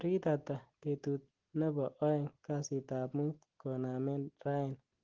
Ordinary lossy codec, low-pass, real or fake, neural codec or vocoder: Opus, 16 kbps; 7.2 kHz; real; none